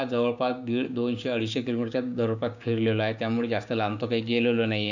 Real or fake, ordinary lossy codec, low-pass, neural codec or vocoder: fake; none; 7.2 kHz; codec, 16 kHz, 6 kbps, DAC